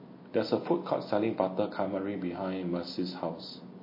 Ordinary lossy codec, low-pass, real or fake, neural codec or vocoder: MP3, 24 kbps; 5.4 kHz; real; none